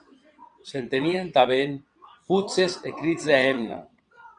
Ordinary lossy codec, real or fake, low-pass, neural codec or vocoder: Opus, 64 kbps; fake; 9.9 kHz; vocoder, 22.05 kHz, 80 mel bands, WaveNeXt